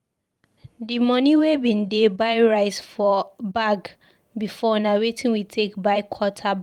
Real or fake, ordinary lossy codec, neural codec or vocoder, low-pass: fake; Opus, 32 kbps; vocoder, 44.1 kHz, 128 mel bands every 512 samples, BigVGAN v2; 19.8 kHz